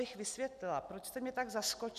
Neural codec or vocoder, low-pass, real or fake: none; 14.4 kHz; real